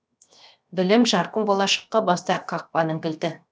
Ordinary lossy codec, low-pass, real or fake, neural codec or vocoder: none; none; fake; codec, 16 kHz, 0.7 kbps, FocalCodec